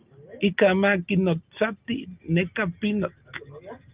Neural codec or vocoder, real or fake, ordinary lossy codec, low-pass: none; real; Opus, 32 kbps; 3.6 kHz